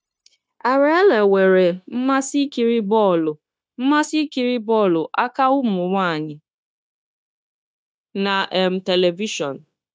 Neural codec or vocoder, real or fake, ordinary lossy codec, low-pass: codec, 16 kHz, 0.9 kbps, LongCat-Audio-Codec; fake; none; none